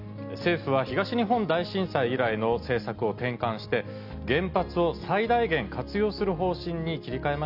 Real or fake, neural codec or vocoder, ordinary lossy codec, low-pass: real; none; none; 5.4 kHz